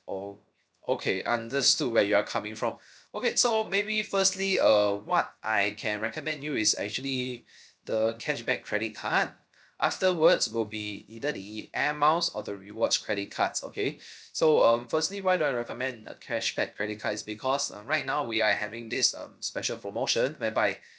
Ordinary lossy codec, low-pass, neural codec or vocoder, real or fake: none; none; codec, 16 kHz, 0.7 kbps, FocalCodec; fake